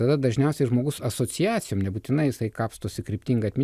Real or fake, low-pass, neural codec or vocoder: real; 14.4 kHz; none